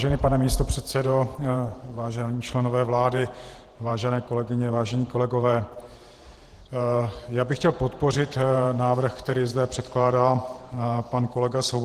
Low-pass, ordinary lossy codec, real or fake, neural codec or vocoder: 14.4 kHz; Opus, 16 kbps; fake; vocoder, 48 kHz, 128 mel bands, Vocos